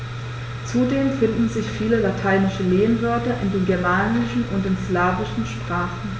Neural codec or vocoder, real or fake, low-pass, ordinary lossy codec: none; real; none; none